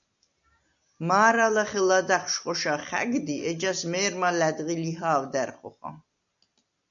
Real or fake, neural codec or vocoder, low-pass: real; none; 7.2 kHz